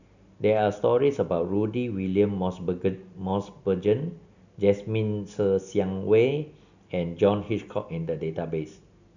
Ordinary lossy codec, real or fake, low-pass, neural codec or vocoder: none; real; 7.2 kHz; none